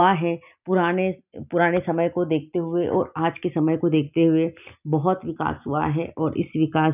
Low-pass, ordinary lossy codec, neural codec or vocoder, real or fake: 3.6 kHz; none; none; real